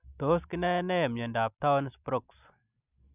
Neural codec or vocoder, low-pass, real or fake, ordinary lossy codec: none; 3.6 kHz; real; none